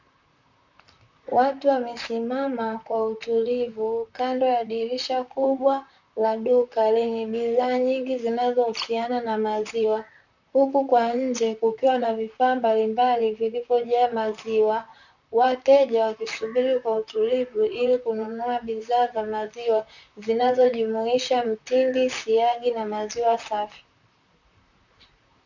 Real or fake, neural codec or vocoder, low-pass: fake; vocoder, 44.1 kHz, 128 mel bands, Pupu-Vocoder; 7.2 kHz